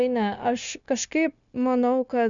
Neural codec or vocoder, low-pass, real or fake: codec, 16 kHz, 0.9 kbps, LongCat-Audio-Codec; 7.2 kHz; fake